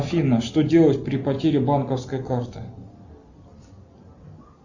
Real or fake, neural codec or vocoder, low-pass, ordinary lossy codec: real; none; 7.2 kHz; Opus, 64 kbps